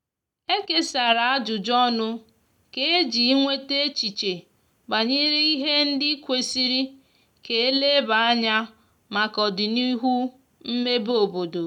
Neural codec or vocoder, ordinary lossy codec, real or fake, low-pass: none; none; real; 19.8 kHz